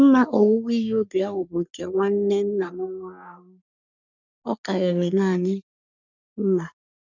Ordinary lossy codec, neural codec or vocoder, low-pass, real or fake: none; codec, 44.1 kHz, 3.4 kbps, Pupu-Codec; 7.2 kHz; fake